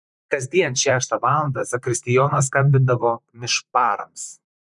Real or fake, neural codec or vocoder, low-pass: fake; vocoder, 44.1 kHz, 128 mel bands, Pupu-Vocoder; 10.8 kHz